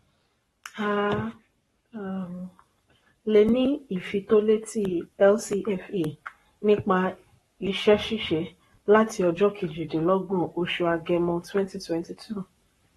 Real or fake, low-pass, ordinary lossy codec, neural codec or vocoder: fake; 19.8 kHz; AAC, 32 kbps; codec, 44.1 kHz, 7.8 kbps, Pupu-Codec